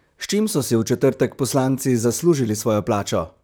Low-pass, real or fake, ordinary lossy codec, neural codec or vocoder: none; fake; none; vocoder, 44.1 kHz, 128 mel bands, Pupu-Vocoder